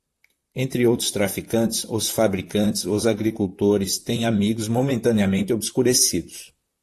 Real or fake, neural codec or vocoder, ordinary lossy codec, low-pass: fake; vocoder, 44.1 kHz, 128 mel bands, Pupu-Vocoder; AAC, 64 kbps; 14.4 kHz